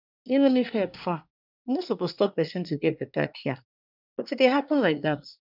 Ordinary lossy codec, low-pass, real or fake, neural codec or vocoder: none; 5.4 kHz; fake; codec, 24 kHz, 1 kbps, SNAC